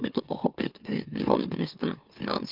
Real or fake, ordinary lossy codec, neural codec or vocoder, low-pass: fake; Opus, 24 kbps; autoencoder, 44.1 kHz, a latent of 192 numbers a frame, MeloTTS; 5.4 kHz